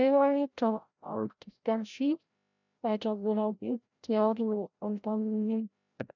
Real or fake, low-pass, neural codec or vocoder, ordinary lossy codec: fake; 7.2 kHz; codec, 16 kHz, 0.5 kbps, FreqCodec, larger model; none